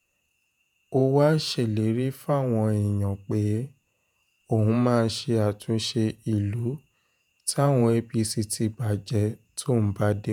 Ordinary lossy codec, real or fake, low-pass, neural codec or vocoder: none; fake; none; vocoder, 48 kHz, 128 mel bands, Vocos